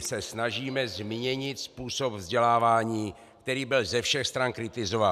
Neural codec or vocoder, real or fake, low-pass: none; real; 14.4 kHz